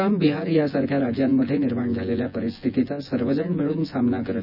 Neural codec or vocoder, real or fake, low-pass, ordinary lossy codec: vocoder, 24 kHz, 100 mel bands, Vocos; fake; 5.4 kHz; none